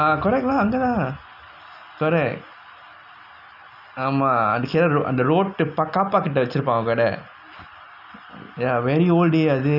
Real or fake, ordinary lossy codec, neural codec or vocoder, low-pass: real; none; none; 5.4 kHz